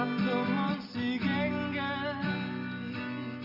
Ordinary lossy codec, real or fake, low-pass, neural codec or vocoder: Opus, 64 kbps; real; 5.4 kHz; none